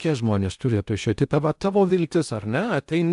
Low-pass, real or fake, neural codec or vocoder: 10.8 kHz; fake; codec, 16 kHz in and 24 kHz out, 0.6 kbps, FocalCodec, streaming, 2048 codes